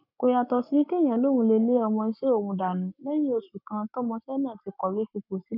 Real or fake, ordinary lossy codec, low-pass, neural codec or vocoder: fake; none; 5.4 kHz; codec, 44.1 kHz, 7.8 kbps, Pupu-Codec